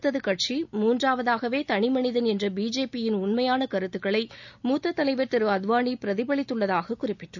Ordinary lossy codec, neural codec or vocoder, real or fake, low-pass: none; none; real; 7.2 kHz